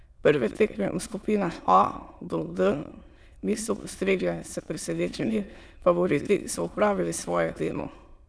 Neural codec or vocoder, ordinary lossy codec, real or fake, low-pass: autoencoder, 22.05 kHz, a latent of 192 numbers a frame, VITS, trained on many speakers; none; fake; none